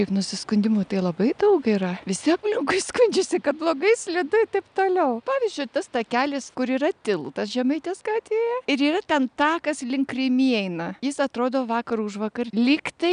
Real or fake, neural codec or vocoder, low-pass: real; none; 9.9 kHz